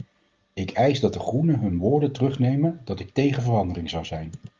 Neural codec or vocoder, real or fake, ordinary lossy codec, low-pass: none; real; Opus, 32 kbps; 7.2 kHz